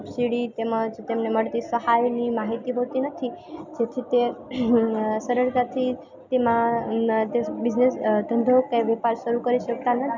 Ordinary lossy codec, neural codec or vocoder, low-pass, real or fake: none; none; 7.2 kHz; real